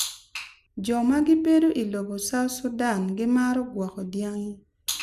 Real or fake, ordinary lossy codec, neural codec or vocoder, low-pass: real; none; none; 14.4 kHz